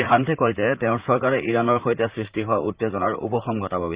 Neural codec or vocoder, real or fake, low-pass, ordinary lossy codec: vocoder, 44.1 kHz, 128 mel bands, Pupu-Vocoder; fake; 3.6 kHz; Opus, 64 kbps